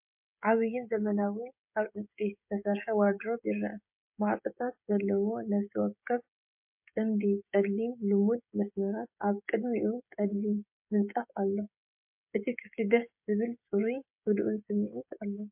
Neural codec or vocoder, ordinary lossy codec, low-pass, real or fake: codec, 16 kHz, 16 kbps, FreqCodec, smaller model; MP3, 32 kbps; 3.6 kHz; fake